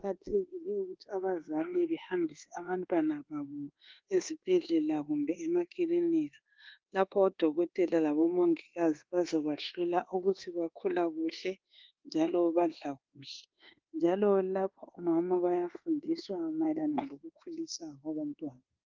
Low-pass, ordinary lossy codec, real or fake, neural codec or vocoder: 7.2 kHz; Opus, 16 kbps; fake; codec, 24 kHz, 1.2 kbps, DualCodec